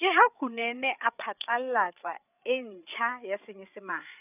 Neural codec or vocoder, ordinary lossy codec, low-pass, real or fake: codec, 16 kHz, 8 kbps, FreqCodec, larger model; none; 3.6 kHz; fake